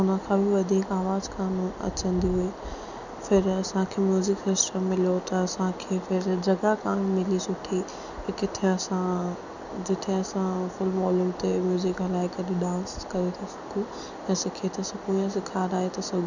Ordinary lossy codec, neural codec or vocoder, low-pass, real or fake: none; none; 7.2 kHz; real